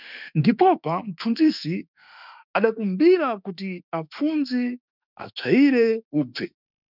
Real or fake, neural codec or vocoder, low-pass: fake; autoencoder, 48 kHz, 32 numbers a frame, DAC-VAE, trained on Japanese speech; 5.4 kHz